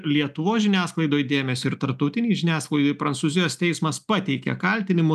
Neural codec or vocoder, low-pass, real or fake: none; 14.4 kHz; real